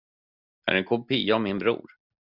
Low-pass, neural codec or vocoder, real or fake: 5.4 kHz; none; real